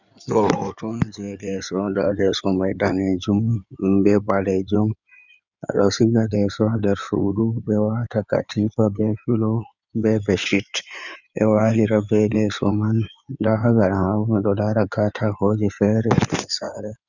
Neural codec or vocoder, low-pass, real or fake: codec, 16 kHz in and 24 kHz out, 2.2 kbps, FireRedTTS-2 codec; 7.2 kHz; fake